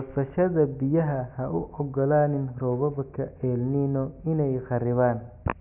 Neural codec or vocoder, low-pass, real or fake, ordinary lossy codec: none; 3.6 kHz; real; none